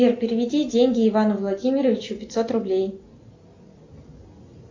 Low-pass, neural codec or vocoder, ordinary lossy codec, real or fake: 7.2 kHz; none; AAC, 48 kbps; real